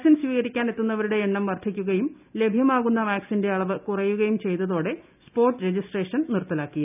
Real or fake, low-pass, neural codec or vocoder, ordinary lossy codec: real; 3.6 kHz; none; none